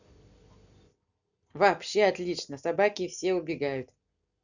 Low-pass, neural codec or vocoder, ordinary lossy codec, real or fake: 7.2 kHz; none; none; real